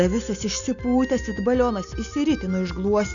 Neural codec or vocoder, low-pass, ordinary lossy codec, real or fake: none; 7.2 kHz; MP3, 96 kbps; real